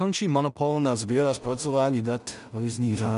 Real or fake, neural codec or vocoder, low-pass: fake; codec, 16 kHz in and 24 kHz out, 0.4 kbps, LongCat-Audio-Codec, two codebook decoder; 10.8 kHz